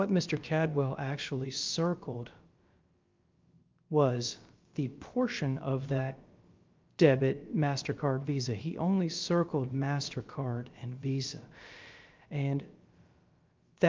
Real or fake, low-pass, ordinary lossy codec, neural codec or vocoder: fake; 7.2 kHz; Opus, 24 kbps; codec, 16 kHz, about 1 kbps, DyCAST, with the encoder's durations